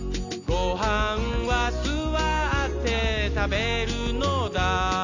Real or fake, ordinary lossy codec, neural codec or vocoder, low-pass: real; AAC, 48 kbps; none; 7.2 kHz